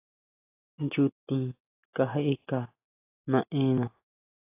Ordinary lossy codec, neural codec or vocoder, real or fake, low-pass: AAC, 32 kbps; none; real; 3.6 kHz